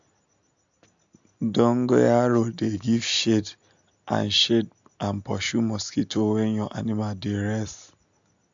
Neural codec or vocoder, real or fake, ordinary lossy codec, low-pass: none; real; AAC, 64 kbps; 7.2 kHz